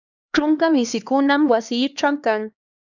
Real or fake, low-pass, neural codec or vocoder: fake; 7.2 kHz; codec, 16 kHz, 2 kbps, X-Codec, HuBERT features, trained on LibriSpeech